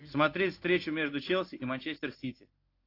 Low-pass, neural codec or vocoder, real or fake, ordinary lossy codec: 5.4 kHz; none; real; AAC, 32 kbps